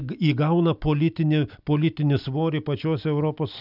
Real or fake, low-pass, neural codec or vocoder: real; 5.4 kHz; none